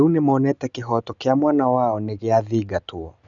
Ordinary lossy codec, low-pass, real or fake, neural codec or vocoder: none; 7.2 kHz; real; none